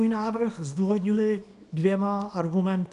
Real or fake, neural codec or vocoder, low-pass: fake; codec, 24 kHz, 0.9 kbps, WavTokenizer, small release; 10.8 kHz